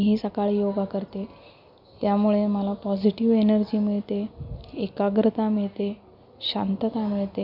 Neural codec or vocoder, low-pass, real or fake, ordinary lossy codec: none; 5.4 kHz; real; none